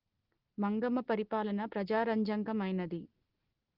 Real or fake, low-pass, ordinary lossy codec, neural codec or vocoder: real; 5.4 kHz; Opus, 16 kbps; none